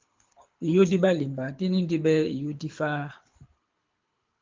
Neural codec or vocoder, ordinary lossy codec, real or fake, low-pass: codec, 24 kHz, 6 kbps, HILCodec; Opus, 32 kbps; fake; 7.2 kHz